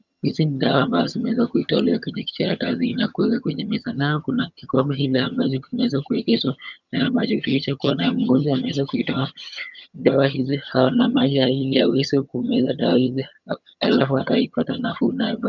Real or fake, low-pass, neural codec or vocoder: fake; 7.2 kHz; vocoder, 22.05 kHz, 80 mel bands, HiFi-GAN